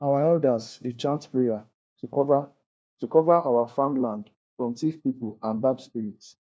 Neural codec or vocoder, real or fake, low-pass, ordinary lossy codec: codec, 16 kHz, 1 kbps, FunCodec, trained on LibriTTS, 50 frames a second; fake; none; none